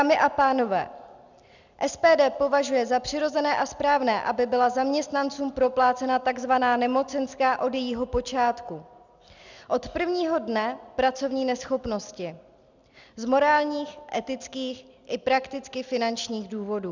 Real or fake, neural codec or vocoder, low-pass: real; none; 7.2 kHz